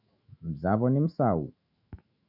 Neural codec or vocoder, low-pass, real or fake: autoencoder, 48 kHz, 128 numbers a frame, DAC-VAE, trained on Japanese speech; 5.4 kHz; fake